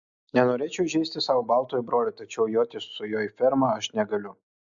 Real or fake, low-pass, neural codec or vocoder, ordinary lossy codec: real; 7.2 kHz; none; MP3, 64 kbps